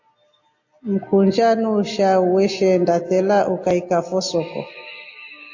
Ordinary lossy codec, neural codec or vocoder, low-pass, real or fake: AAC, 48 kbps; none; 7.2 kHz; real